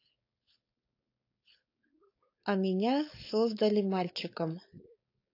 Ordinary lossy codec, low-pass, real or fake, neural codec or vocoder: AAC, 32 kbps; 5.4 kHz; fake; codec, 16 kHz, 4.8 kbps, FACodec